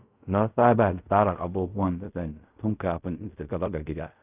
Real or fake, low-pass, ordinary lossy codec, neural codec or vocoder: fake; 3.6 kHz; none; codec, 16 kHz in and 24 kHz out, 0.4 kbps, LongCat-Audio-Codec, fine tuned four codebook decoder